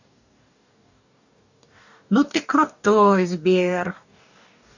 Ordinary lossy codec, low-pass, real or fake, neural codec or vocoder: none; 7.2 kHz; fake; codec, 44.1 kHz, 2.6 kbps, DAC